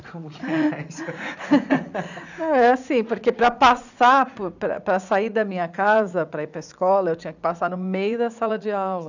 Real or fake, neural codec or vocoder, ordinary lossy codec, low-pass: fake; vocoder, 44.1 kHz, 80 mel bands, Vocos; none; 7.2 kHz